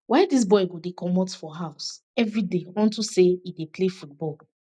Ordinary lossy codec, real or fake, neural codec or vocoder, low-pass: none; real; none; none